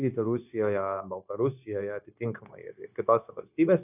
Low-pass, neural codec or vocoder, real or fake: 3.6 kHz; codec, 16 kHz, 0.9 kbps, LongCat-Audio-Codec; fake